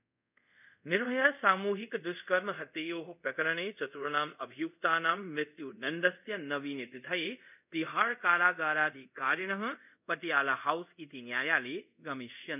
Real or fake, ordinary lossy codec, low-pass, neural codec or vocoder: fake; none; 3.6 kHz; codec, 24 kHz, 0.5 kbps, DualCodec